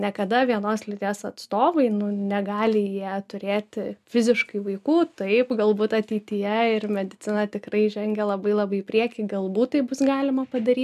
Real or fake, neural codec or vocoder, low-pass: real; none; 14.4 kHz